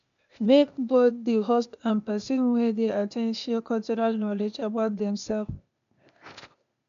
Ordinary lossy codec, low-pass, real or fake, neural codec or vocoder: none; 7.2 kHz; fake; codec, 16 kHz, 0.8 kbps, ZipCodec